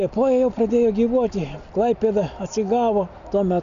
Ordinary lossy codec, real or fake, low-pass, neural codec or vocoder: MP3, 96 kbps; real; 7.2 kHz; none